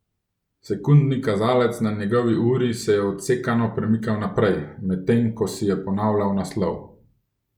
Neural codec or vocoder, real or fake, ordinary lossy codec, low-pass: none; real; none; 19.8 kHz